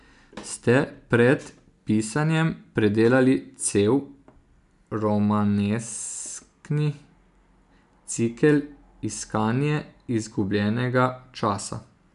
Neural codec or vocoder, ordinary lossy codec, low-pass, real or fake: none; none; 10.8 kHz; real